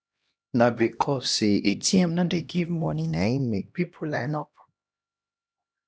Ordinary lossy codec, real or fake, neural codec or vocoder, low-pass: none; fake; codec, 16 kHz, 1 kbps, X-Codec, HuBERT features, trained on LibriSpeech; none